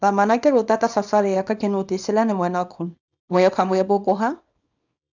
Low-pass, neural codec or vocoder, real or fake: 7.2 kHz; codec, 24 kHz, 0.9 kbps, WavTokenizer, small release; fake